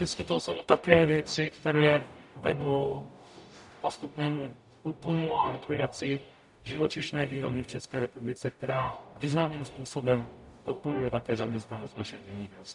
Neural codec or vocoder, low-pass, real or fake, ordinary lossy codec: codec, 44.1 kHz, 0.9 kbps, DAC; 10.8 kHz; fake; MP3, 96 kbps